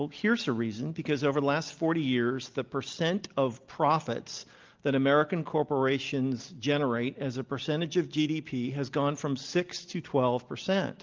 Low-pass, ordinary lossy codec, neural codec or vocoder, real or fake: 7.2 kHz; Opus, 24 kbps; none; real